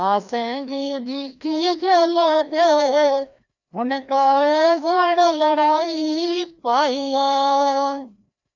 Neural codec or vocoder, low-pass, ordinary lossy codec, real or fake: codec, 16 kHz, 1 kbps, FreqCodec, larger model; 7.2 kHz; none; fake